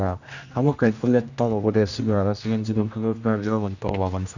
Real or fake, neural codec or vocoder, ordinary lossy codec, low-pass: fake; codec, 16 kHz, 1 kbps, X-Codec, HuBERT features, trained on general audio; none; 7.2 kHz